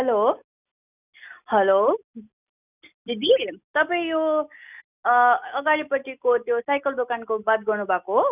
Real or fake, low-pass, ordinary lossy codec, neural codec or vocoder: real; 3.6 kHz; none; none